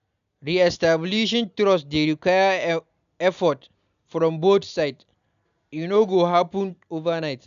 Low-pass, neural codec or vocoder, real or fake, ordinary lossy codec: 7.2 kHz; none; real; none